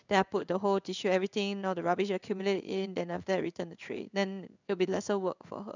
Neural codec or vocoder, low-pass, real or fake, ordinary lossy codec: codec, 16 kHz in and 24 kHz out, 1 kbps, XY-Tokenizer; 7.2 kHz; fake; none